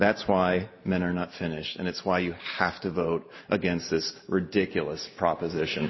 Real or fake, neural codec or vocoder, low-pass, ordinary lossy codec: real; none; 7.2 kHz; MP3, 24 kbps